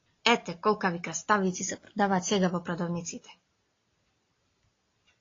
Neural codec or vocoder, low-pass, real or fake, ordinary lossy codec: none; 7.2 kHz; real; AAC, 32 kbps